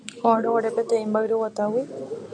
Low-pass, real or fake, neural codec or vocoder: 9.9 kHz; real; none